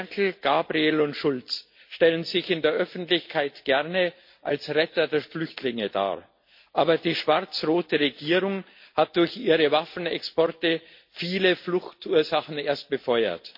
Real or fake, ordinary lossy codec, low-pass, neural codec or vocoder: real; MP3, 32 kbps; 5.4 kHz; none